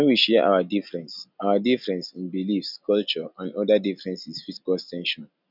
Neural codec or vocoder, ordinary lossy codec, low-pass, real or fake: none; none; 5.4 kHz; real